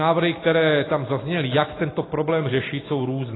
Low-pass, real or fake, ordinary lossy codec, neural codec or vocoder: 7.2 kHz; real; AAC, 16 kbps; none